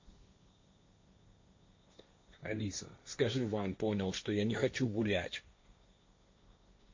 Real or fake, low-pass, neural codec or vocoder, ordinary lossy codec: fake; 7.2 kHz; codec, 16 kHz, 1.1 kbps, Voila-Tokenizer; MP3, 48 kbps